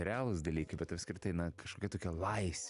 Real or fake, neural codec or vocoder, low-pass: real; none; 10.8 kHz